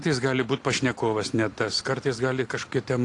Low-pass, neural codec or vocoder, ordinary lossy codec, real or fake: 10.8 kHz; none; AAC, 48 kbps; real